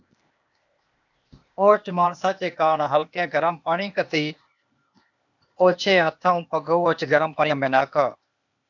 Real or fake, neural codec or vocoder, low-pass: fake; codec, 16 kHz, 0.8 kbps, ZipCodec; 7.2 kHz